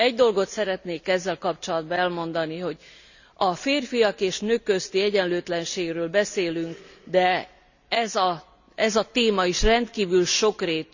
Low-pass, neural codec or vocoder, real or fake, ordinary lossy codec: 7.2 kHz; none; real; none